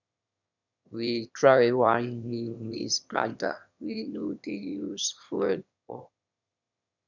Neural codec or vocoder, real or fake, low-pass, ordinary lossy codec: autoencoder, 22.05 kHz, a latent of 192 numbers a frame, VITS, trained on one speaker; fake; 7.2 kHz; none